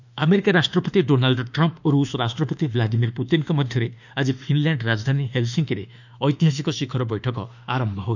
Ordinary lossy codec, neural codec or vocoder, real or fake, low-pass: none; autoencoder, 48 kHz, 32 numbers a frame, DAC-VAE, trained on Japanese speech; fake; 7.2 kHz